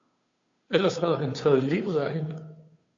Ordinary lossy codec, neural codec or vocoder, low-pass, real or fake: AAC, 48 kbps; codec, 16 kHz, 2 kbps, FunCodec, trained on Chinese and English, 25 frames a second; 7.2 kHz; fake